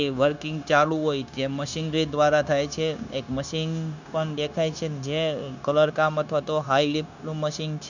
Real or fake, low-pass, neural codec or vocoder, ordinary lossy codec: fake; 7.2 kHz; codec, 16 kHz, 0.9 kbps, LongCat-Audio-Codec; none